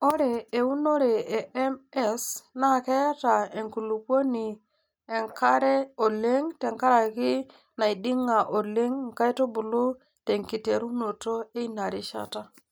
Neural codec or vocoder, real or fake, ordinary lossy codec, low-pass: none; real; none; none